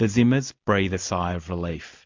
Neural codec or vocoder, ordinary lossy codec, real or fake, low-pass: none; MP3, 48 kbps; real; 7.2 kHz